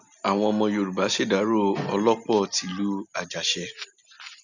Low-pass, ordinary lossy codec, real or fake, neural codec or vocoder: 7.2 kHz; none; real; none